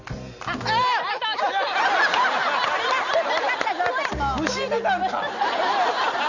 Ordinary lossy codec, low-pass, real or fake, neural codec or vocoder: none; 7.2 kHz; real; none